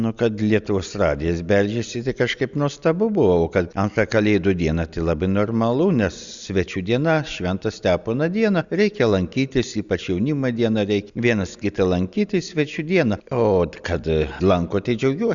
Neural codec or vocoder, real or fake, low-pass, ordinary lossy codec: none; real; 7.2 kHz; Opus, 64 kbps